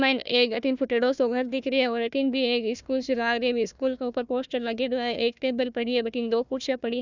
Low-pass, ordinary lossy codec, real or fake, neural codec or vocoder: 7.2 kHz; none; fake; codec, 16 kHz, 1 kbps, FunCodec, trained on Chinese and English, 50 frames a second